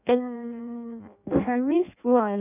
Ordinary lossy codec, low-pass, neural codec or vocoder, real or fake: none; 3.6 kHz; codec, 16 kHz in and 24 kHz out, 0.6 kbps, FireRedTTS-2 codec; fake